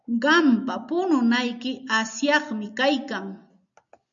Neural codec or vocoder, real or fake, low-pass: none; real; 7.2 kHz